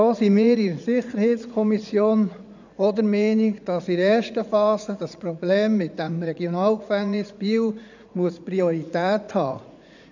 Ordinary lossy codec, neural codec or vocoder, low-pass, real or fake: none; vocoder, 44.1 kHz, 80 mel bands, Vocos; 7.2 kHz; fake